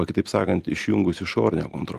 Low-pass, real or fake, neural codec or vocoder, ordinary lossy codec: 14.4 kHz; real; none; Opus, 24 kbps